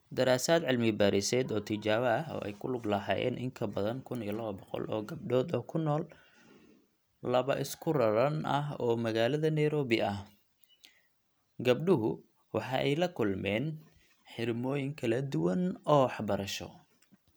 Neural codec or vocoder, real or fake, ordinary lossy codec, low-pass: none; real; none; none